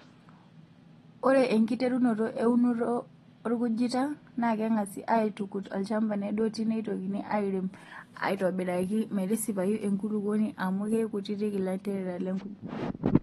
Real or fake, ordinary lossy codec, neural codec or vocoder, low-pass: real; AAC, 32 kbps; none; 19.8 kHz